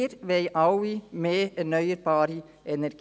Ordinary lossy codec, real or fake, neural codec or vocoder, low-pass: none; real; none; none